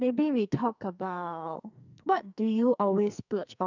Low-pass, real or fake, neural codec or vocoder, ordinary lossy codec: 7.2 kHz; fake; codec, 44.1 kHz, 2.6 kbps, SNAC; none